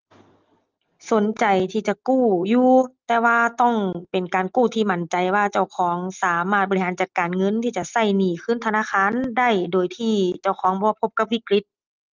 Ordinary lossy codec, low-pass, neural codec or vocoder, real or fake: Opus, 32 kbps; 7.2 kHz; none; real